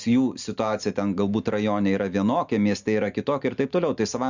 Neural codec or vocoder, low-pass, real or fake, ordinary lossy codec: none; 7.2 kHz; real; Opus, 64 kbps